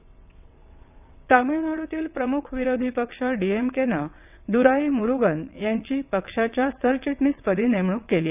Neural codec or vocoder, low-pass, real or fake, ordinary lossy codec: vocoder, 22.05 kHz, 80 mel bands, WaveNeXt; 3.6 kHz; fake; none